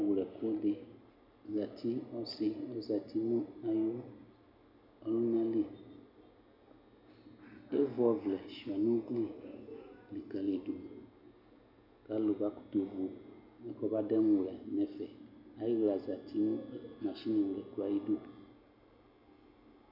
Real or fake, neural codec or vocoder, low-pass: real; none; 5.4 kHz